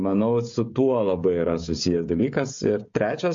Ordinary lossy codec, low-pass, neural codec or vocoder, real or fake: MP3, 48 kbps; 7.2 kHz; codec, 16 kHz, 16 kbps, FreqCodec, smaller model; fake